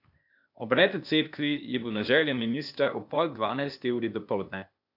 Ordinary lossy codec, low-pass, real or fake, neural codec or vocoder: MP3, 48 kbps; 5.4 kHz; fake; codec, 16 kHz, 0.8 kbps, ZipCodec